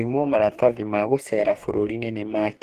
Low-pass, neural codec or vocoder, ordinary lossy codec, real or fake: 14.4 kHz; codec, 44.1 kHz, 2.6 kbps, DAC; Opus, 24 kbps; fake